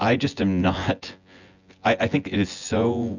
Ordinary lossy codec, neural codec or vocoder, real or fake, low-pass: Opus, 64 kbps; vocoder, 24 kHz, 100 mel bands, Vocos; fake; 7.2 kHz